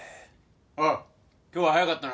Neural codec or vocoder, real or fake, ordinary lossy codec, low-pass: none; real; none; none